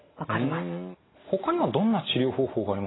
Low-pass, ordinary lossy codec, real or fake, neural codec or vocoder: 7.2 kHz; AAC, 16 kbps; real; none